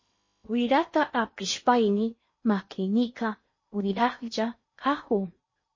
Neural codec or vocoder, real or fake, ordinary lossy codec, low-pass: codec, 16 kHz in and 24 kHz out, 0.8 kbps, FocalCodec, streaming, 65536 codes; fake; MP3, 32 kbps; 7.2 kHz